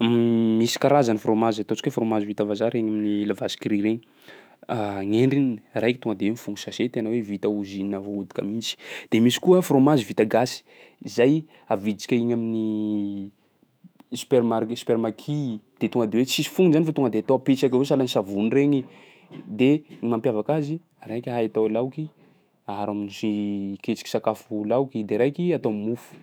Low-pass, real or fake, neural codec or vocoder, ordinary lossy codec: none; fake; autoencoder, 48 kHz, 128 numbers a frame, DAC-VAE, trained on Japanese speech; none